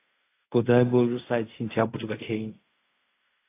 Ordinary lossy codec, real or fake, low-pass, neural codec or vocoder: AAC, 24 kbps; fake; 3.6 kHz; codec, 16 kHz in and 24 kHz out, 0.4 kbps, LongCat-Audio-Codec, fine tuned four codebook decoder